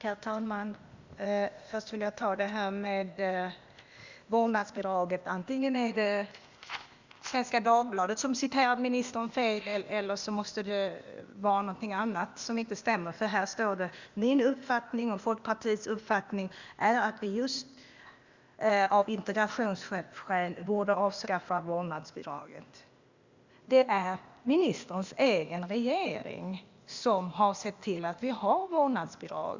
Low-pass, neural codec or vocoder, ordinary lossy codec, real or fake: 7.2 kHz; codec, 16 kHz, 0.8 kbps, ZipCodec; Opus, 64 kbps; fake